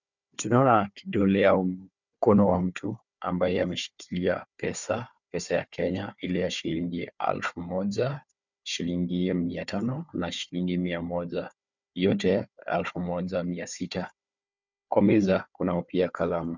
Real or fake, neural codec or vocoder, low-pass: fake; codec, 16 kHz, 4 kbps, FunCodec, trained on Chinese and English, 50 frames a second; 7.2 kHz